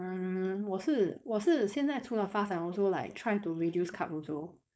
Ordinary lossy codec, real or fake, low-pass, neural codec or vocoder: none; fake; none; codec, 16 kHz, 4.8 kbps, FACodec